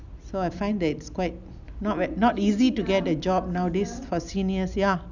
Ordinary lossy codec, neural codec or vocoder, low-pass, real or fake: none; none; 7.2 kHz; real